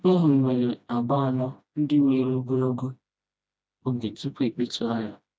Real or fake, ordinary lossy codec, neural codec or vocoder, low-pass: fake; none; codec, 16 kHz, 1 kbps, FreqCodec, smaller model; none